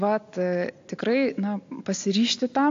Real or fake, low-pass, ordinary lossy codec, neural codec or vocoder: real; 7.2 kHz; AAC, 48 kbps; none